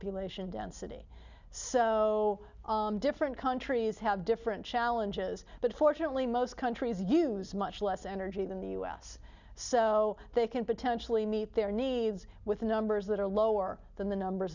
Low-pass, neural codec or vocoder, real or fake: 7.2 kHz; none; real